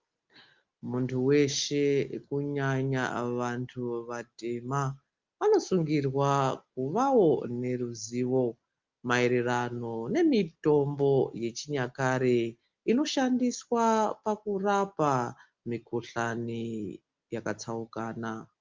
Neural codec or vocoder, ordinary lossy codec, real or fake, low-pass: none; Opus, 24 kbps; real; 7.2 kHz